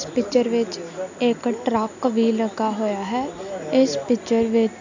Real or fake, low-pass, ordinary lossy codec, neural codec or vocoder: real; 7.2 kHz; none; none